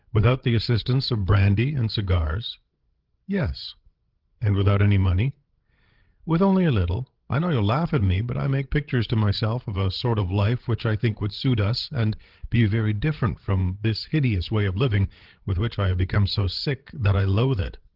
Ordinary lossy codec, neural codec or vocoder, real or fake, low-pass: Opus, 16 kbps; codec, 16 kHz, 16 kbps, FreqCodec, larger model; fake; 5.4 kHz